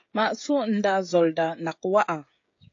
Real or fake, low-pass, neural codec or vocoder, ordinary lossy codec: fake; 7.2 kHz; codec, 16 kHz, 16 kbps, FreqCodec, smaller model; AAC, 48 kbps